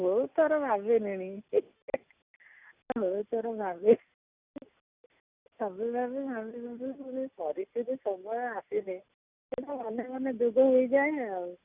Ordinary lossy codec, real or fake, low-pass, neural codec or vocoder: Opus, 64 kbps; real; 3.6 kHz; none